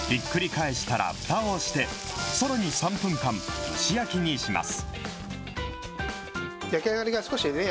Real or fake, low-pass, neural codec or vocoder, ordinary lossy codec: real; none; none; none